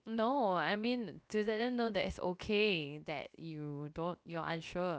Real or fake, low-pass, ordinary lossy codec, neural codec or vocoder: fake; none; none; codec, 16 kHz, 0.7 kbps, FocalCodec